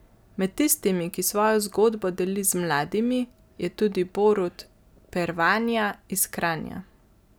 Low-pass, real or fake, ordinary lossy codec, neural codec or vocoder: none; real; none; none